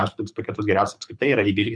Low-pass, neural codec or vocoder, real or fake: 9.9 kHz; codec, 24 kHz, 6 kbps, HILCodec; fake